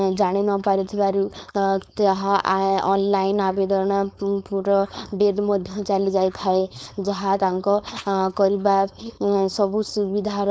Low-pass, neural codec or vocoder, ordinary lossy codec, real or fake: none; codec, 16 kHz, 4.8 kbps, FACodec; none; fake